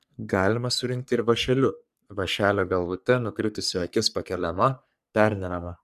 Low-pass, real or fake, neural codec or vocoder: 14.4 kHz; fake; codec, 44.1 kHz, 3.4 kbps, Pupu-Codec